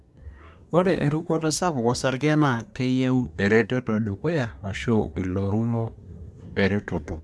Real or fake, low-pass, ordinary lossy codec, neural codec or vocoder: fake; none; none; codec, 24 kHz, 1 kbps, SNAC